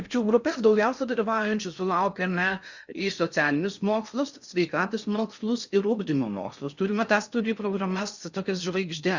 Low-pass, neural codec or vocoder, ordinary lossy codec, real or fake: 7.2 kHz; codec, 16 kHz in and 24 kHz out, 0.6 kbps, FocalCodec, streaming, 4096 codes; Opus, 64 kbps; fake